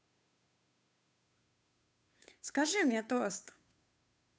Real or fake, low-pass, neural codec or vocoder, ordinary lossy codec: fake; none; codec, 16 kHz, 2 kbps, FunCodec, trained on Chinese and English, 25 frames a second; none